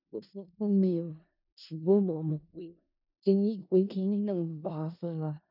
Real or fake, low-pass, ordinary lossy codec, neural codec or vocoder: fake; 5.4 kHz; none; codec, 16 kHz in and 24 kHz out, 0.4 kbps, LongCat-Audio-Codec, four codebook decoder